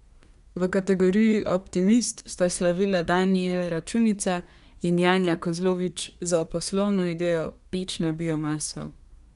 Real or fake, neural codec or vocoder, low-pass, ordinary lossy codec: fake; codec, 24 kHz, 1 kbps, SNAC; 10.8 kHz; none